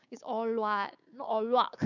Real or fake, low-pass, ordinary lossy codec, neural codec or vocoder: fake; 7.2 kHz; none; codec, 16 kHz, 6 kbps, DAC